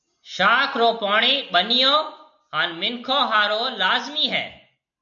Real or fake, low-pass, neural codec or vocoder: real; 7.2 kHz; none